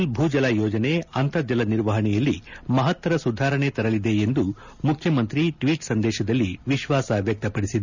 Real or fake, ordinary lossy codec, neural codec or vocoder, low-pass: real; none; none; 7.2 kHz